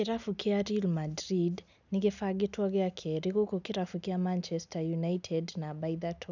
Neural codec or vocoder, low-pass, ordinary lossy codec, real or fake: none; 7.2 kHz; none; real